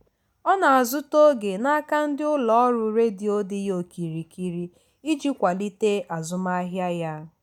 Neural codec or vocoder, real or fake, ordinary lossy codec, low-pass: none; real; none; 19.8 kHz